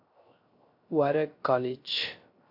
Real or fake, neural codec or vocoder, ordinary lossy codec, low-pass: fake; codec, 16 kHz, 0.3 kbps, FocalCodec; AAC, 32 kbps; 5.4 kHz